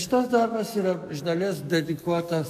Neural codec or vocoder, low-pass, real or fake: codec, 44.1 kHz, 7.8 kbps, DAC; 14.4 kHz; fake